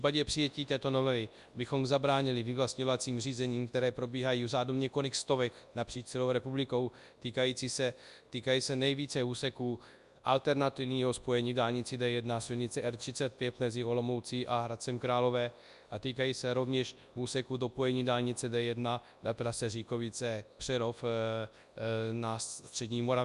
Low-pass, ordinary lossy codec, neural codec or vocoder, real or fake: 10.8 kHz; Opus, 64 kbps; codec, 24 kHz, 0.9 kbps, WavTokenizer, large speech release; fake